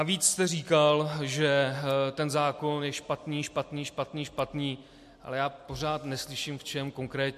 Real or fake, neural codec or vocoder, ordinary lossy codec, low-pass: real; none; MP3, 64 kbps; 14.4 kHz